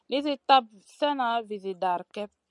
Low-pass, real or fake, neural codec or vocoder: 10.8 kHz; real; none